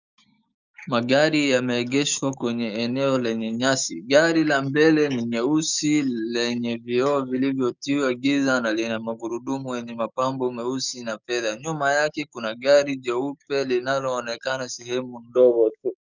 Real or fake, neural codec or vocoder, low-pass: fake; codec, 16 kHz, 6 kbps, DAC; 7.2 kHz